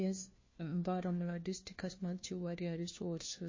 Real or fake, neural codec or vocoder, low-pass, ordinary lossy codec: fake; codec, 16 kHz, 1 kbps, FunCodec, trained on LibriTTS, 50 frames a second; 7.2 kHz; MP3, 32 kbps